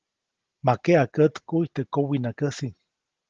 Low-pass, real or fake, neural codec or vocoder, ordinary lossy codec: 7.2 kHz; real; none; Opus, 16 kbps